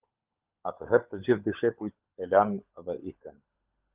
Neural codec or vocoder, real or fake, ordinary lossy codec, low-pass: codec, 16 kHz, 16 kbps, FunCodec, trained on LibriTTS, 50 frames a second; fake; Opus, 32 kbps; 3.6 kHz